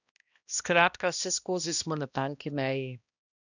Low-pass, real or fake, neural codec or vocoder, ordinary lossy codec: 7.2 kHz; fake; codec, 16 kHz, 1 kbps, X-Codec, HuBERT features, trained on balanced general audio; none